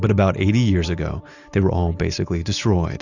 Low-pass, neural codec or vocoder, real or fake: 7.2 kHz; none; real